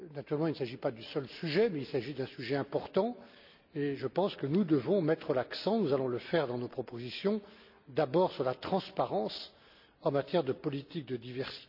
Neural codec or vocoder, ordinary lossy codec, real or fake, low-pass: none; none; real; 5.4 kHz